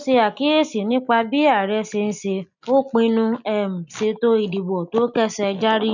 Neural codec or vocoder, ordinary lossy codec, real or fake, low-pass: none; none; real; 7.2 kHz